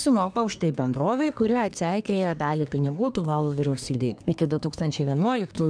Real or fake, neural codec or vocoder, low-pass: fake; codec, 24 kHz, 1 kbps, SNAC; 9.9 kHz